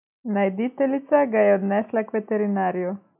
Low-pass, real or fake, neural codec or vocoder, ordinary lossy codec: 3.6 kHz; real; none; none